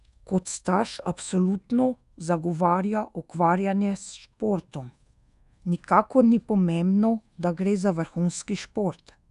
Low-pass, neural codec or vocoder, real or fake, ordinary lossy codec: 10.8 kHz; codec, 24 kHz, 1.2 kbps, DualCodec; fake; none